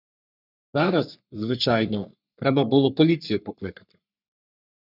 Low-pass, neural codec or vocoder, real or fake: 5.4 kHz; codec, 44.1 kHz, 3.4 kbps, Pupu-Codec; fake